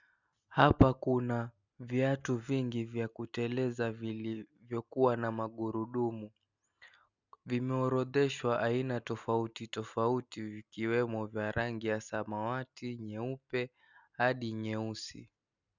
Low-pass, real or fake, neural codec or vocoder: 7.2 kHz; real; none